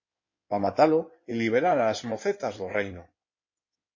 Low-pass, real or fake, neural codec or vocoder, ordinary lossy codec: 7.2 kHz; fake; codec, 16 kHz in and 24 kHz out, 2.2 kbps, FireRedTTS-2 codec; MP3, 32 kbps